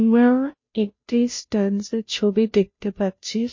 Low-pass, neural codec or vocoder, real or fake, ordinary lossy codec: 7.2 kHz; codec, 16 kHz, 0.5 kbps, FunCodec, trained on Chinese and English, 25 frames a second; fake; MP3, 32 kbps